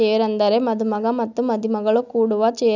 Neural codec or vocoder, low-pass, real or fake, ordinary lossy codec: none; 7.2 kHz; real; none